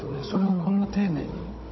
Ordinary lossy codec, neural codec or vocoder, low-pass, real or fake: MP3, 24 kbps; codec, 16 kHz, 16 kbps, FunCodec, trained on Chinese and English, 50 frames a second; 7.2 kHz; fake